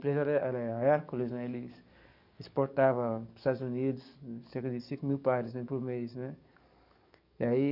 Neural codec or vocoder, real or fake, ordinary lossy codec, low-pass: codec, 44.1 kHz, 7.8 kbps, DAC; fake; none; 5.4 kHz